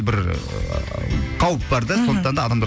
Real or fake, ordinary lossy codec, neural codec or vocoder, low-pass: real; none; none; none